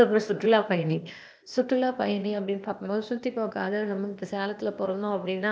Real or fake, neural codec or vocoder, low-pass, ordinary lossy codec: fake; codec, 16 kHz, 0.8 kbps, ZipCodec; none; none